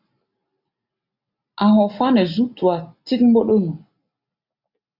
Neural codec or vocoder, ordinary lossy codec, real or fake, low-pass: none; AAC, 48 kbps; real; 5.4 kHz